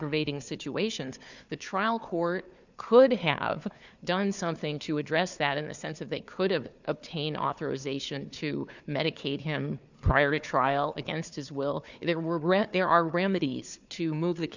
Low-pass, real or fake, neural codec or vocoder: 7.2 kHz; fake; codec, 16 kHz, 4 kbps, FunCodec, trained on Chinese and English, 50 frames a second